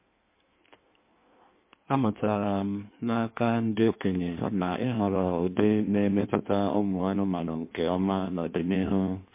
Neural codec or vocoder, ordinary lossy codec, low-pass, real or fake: codec, 16 kHz in and 24 kHz out, 1.1 kbps, FireRedTTS-2 codec; MP3, 24 kbps; 3.6 kHz; fake